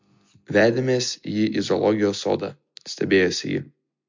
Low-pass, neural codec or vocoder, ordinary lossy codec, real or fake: 7.2 kHz; none; MP3, 48 kbps; real